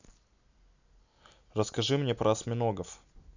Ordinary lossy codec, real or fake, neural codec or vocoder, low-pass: none; real; none; 7.2 kHz